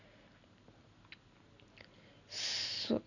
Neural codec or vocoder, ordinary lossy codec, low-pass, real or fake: none; none; 7.2 kHz; real